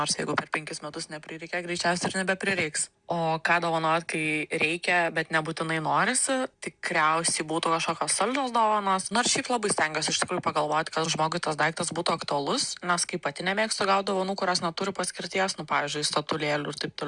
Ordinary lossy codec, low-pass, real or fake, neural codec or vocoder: Opus, 64 kbps; 9.9 kHz; real; none